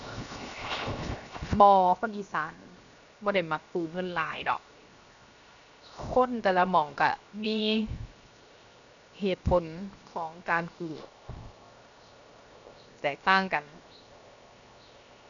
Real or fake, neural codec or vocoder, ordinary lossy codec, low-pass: fake; codec, 16 kHz, 0.7 kbps, FocalCodec; none; 7.2 kHz